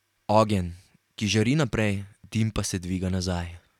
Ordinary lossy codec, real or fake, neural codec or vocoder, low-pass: none; real; none; 19.8 kHz